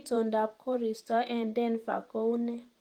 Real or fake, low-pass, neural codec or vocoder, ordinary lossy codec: fake; 19.8 kHz; vocoder, 44.1 kHz, 128 mel bands, Pupu-Vocoder; Opus, 32 kbps